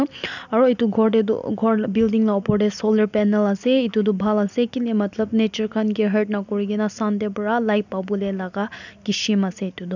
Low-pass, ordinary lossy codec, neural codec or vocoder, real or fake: 7.2 kHz; none; none; real